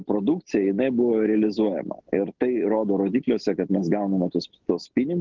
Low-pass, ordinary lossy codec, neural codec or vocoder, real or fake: 7.2 kHz; Opus, 16 kbps; none; real